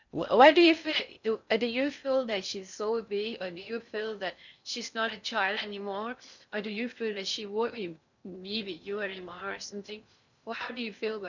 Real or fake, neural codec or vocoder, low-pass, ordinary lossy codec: fake; codec, 16 kHz in and 24 kHz out, 0.6 kbps, FocalCodec, streaming, 4096 codes; 7.2 kHz; none